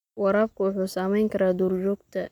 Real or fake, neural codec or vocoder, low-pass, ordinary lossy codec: real; none; 19.8 kHz; none